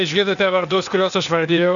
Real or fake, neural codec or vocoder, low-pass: fake; codec, 16 kHz, 0.8 kbps, ZipCodec; 7.2 kHz